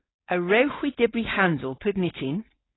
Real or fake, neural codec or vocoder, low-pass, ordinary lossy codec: fake; codec, 16 kHz, 4.8 kbps, FACodec; 7.2 kHz; AAC, 16 kbps